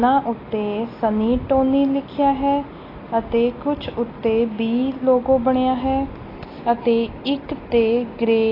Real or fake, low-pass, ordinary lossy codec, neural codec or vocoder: real; 5.4 kHz; AAC, 24 kbps; none